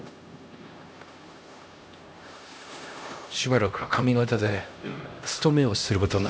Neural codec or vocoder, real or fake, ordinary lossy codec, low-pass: codec, 16 kHz, 1 kbps, X-Codec, HuBERT features, trained on LibriSpeech; fake; none; none